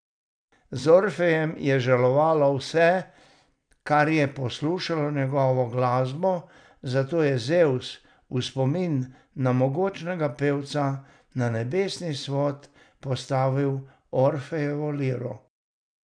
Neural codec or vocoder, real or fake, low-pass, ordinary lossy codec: none; real; 9.9 kHz; none